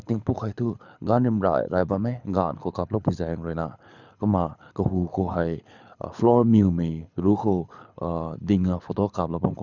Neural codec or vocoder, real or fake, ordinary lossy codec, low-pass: codec, 24 kHz, 6 kbps, HILCodec; fake; none; 7.2 kHz